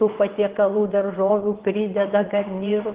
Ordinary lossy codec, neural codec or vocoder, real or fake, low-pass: Opus, 16 kbps; vocoder, 22.05 kHz, 80 mel bands, WaveNeXt; fake; 3.6 kHz